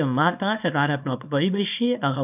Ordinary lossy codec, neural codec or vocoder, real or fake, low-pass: none; codec, 24 kHz, 0.9 kbps, WavTokenizer, small release; fake; 3.6 kHz